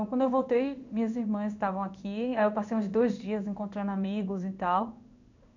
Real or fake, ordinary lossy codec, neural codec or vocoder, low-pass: fake; none; codec, 16 kHz in and 24 kHz out, 1 kbps, XY-Tokenizer; 7.2 kHz